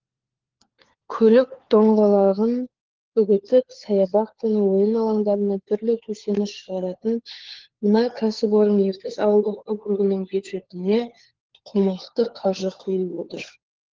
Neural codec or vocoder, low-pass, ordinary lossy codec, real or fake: codec, 16 kHz, 4 kbps, FunCodec, trained on LibriTTS, 50 frames a second; 7.2 kHz; Opus, 16 kbps; fake